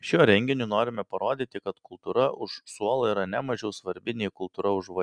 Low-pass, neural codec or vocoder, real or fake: 9.9 kHz; none; real